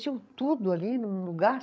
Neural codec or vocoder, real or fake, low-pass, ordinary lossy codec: codec, 16 kHz, 16 kbps, FreqCodec, larger model; fake; none; none